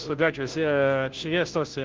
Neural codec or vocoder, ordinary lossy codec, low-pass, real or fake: codec, 16 kHz, 0.5 kbps, FunCodec, trained on Chinese and English, 25 frames a second; Opus, 16 kbps; 7.2 kHz; fake